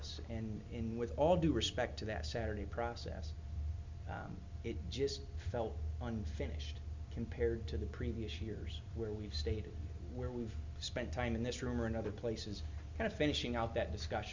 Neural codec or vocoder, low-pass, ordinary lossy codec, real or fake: none; 7.2 kHz; MP3, 64 kbps; real